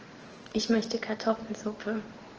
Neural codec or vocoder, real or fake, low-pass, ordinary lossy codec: none; real; 7.2 kHz; Opus, 16 kbps